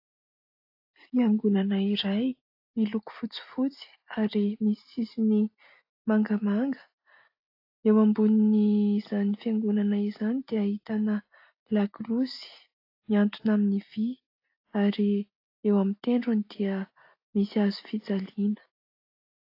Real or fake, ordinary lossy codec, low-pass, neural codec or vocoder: real; AAC, 32 kbps; 5.4 kHz; none